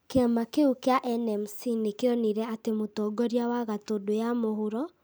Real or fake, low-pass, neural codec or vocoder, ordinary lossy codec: real; none; none; none